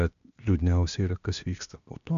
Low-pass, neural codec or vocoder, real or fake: 7.2 kHz; codec, 16 kHz, 0.8 kbps, ZipCodec; fake